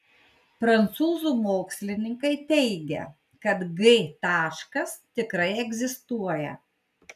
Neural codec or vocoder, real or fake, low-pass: none; real; 14.4 kHz